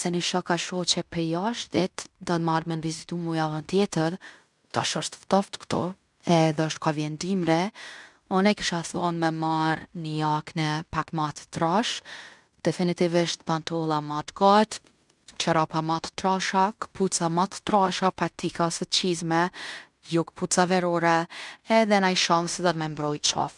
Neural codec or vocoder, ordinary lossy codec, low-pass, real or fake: codec, 16 kHz in and 24 kHz out, 0.9 kbps, LongCat-Audio-Codec, fine tuned four codebook decoder; none; 10.8 kHz; fake